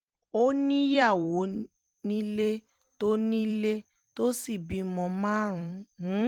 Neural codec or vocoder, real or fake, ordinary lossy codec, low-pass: vocoder, 44.1 kHz, 128 mel bands every 256 samples, BigVGAN v2; fake; Opus, 24 kbps; 19.8 kHz